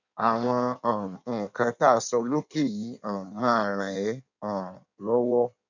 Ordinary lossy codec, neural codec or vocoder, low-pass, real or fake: none; codec, 16 kHz in and 24 kHz out, 1.1 kbps, FireRedTTS-2 codec; 7.2 kHz; fake